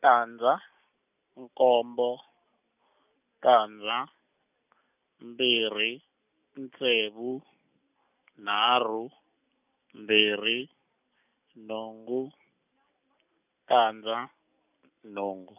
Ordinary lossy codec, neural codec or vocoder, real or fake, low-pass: none; none; real; 3.6 kHz